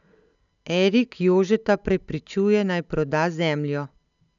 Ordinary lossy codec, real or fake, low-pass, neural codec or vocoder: none; real; 7.2 kHz; none